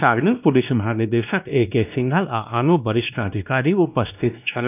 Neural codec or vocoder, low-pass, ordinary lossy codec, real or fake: codec, 16 kHz, 1 kbps, X-Codec, WavLM features, trained on Multilingual LibriSpeech; 3.6 kHz; none; fake